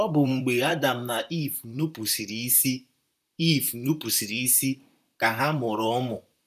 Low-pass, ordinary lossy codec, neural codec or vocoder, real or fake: 14.4 kHz; none; vocoder, 44.1 kHz, 128 mel bands, Pupu-Vocoder; fake